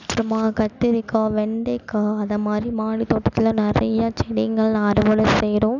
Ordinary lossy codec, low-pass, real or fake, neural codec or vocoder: none; 7.2 kHz; real; none